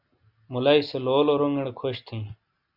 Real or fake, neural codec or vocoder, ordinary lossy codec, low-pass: real; none; Opus, 64 kbps; 5.4 kHz